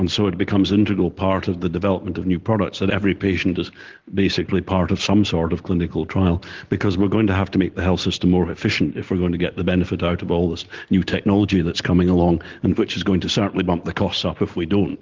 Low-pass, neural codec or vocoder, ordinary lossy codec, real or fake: 7.2 kHz; none; Opus, 16 kbps; real